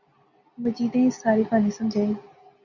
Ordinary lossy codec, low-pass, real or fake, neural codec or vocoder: Opus, 64 kbps; 7.2 kHz; real; none